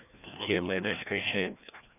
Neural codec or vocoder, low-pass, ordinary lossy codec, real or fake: codec, 16 kHz, 1 kbps, FreqCodec, larger model; 3.6 kHz; none; fake